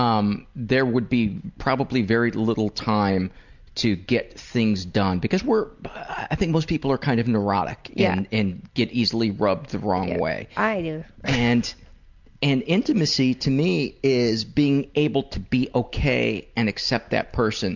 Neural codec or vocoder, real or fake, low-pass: none; real; 7.2 kHz